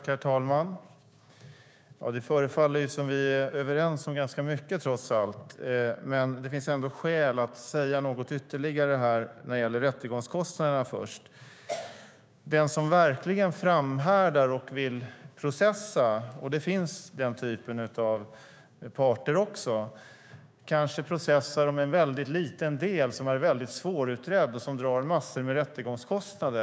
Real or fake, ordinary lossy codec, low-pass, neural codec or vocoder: fake; none; none; codec, 16 kHz, 6 kbps, DAC